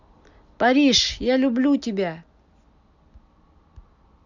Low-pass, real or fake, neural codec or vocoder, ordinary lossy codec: 7.2 kHz; real; none; none